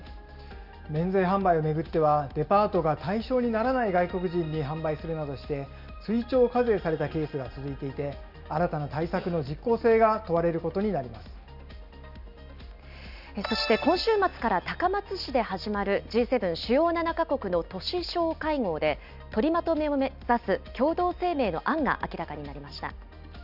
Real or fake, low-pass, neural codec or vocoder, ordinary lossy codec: real; 5.4 kHz; none; none